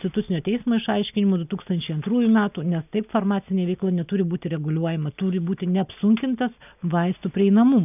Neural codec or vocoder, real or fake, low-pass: none; real; 3.6 kHz